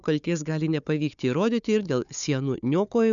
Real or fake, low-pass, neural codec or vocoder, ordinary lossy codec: fake; 7.2 kHz; codec, 16 kHz, 4 kbps, FunCodec, trained on Chinese and English, 50 frames a second; MP3, 96 kbps